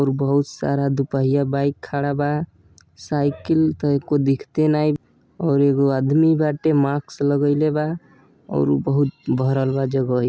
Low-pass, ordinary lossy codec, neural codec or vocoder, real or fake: none; none; none; real